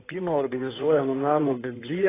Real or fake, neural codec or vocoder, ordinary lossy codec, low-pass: fake; codec, 16 kHz in and 24 kHz out, 2.2 kbps, FireRedTTS-2 codec; AAC, 16 kbps; 3.6 kHz